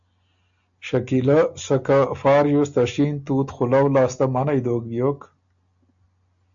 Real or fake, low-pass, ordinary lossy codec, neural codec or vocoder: real; 7.2 kHz; AAC, 64 kbps; none